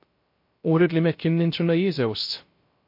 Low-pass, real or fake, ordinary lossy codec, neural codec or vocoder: 5.4 kHz; fake; MP3, 32 kbps; codec, 16 kHz, 0.3 kbps, FocalCodec